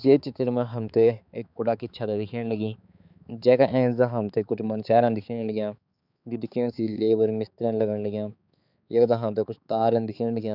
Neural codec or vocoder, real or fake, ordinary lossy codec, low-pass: codec, 16 kHz, 4 kbps, X-Codec, HuBERT features, trained on balanced general audio; fake; none; 5.4 kHz